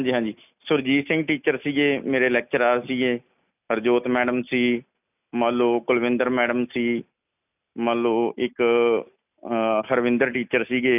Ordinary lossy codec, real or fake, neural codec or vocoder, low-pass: none; real; none; 3.6 kHz